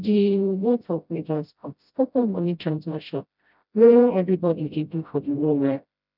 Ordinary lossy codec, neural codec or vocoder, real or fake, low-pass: none; codec, 16 kHz, 0.5 kbps, FreqCodec, smaller model; fake; 5.4 kHz